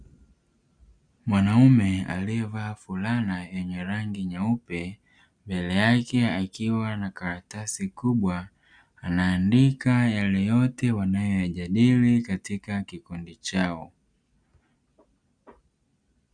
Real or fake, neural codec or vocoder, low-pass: real; none; 9.9 kHz